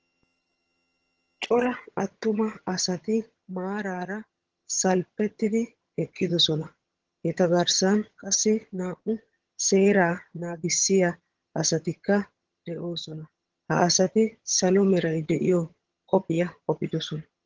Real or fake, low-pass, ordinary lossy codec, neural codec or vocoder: fake; 7.2 kHz; Opus, 16 kbps; vocoder, 22.05 kHz, 80 mel bands, HiFi-GAN